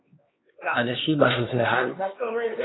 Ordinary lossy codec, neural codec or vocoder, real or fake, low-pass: AAC, 16 kbps; codec, 16 kHz, 2 kbps, X-Codec, HuBERT features, trained on LibriSpeech; fake; 7.2 kHz